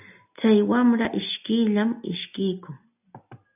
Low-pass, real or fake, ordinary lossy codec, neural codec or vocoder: 3.6 kHz; real; AAC, 32 kbps; none